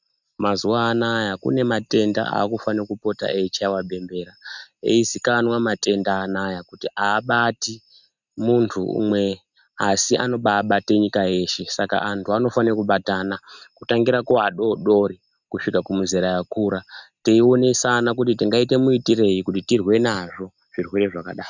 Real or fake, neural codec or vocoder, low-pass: real; none; 7.2 kHz